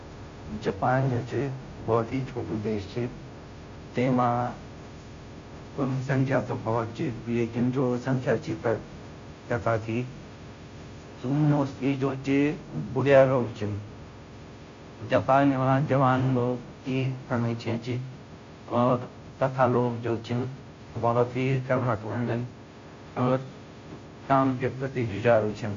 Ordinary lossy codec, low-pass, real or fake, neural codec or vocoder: MP3, 48 kbps; 7.2 kHz; fake; codec, 16 kHz, 0.5 kbps, FunCodec, trained on Chinese and English, 25 frames a second